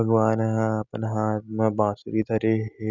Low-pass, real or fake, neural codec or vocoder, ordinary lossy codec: 7.2 kHz; real; none; none